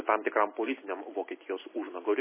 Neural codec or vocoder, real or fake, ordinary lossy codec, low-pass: none; real; MP3, 16 kbps; 3.6 kHz